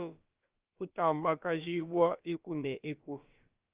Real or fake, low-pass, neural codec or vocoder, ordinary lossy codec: fake; 3.6 kHz; codec, 16 kHz, about 1 kbps, DyCAST, with the encoder's durations; Opus, 24 kbps